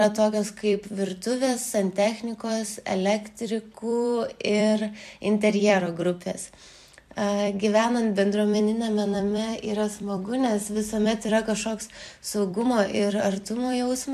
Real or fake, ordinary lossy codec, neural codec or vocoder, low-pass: fake; MP3, 96 kbps; vocoder, 44.1 kHz, 128 mel bands every 256 samples, BigVGAN v2; 14.4 kHz